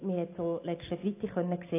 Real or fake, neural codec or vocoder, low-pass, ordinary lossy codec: real; none; 3.6 kHz; AAC, 24 kbps